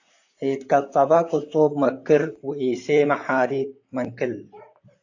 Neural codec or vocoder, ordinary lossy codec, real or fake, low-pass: vocoder, 44.1 kHz, 128 mel bands, Pupu-Vocoder; AAC, 48 kbps; fake; 7.2 kHz